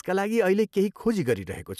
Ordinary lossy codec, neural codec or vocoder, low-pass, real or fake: none; none; 14.4 kHz; real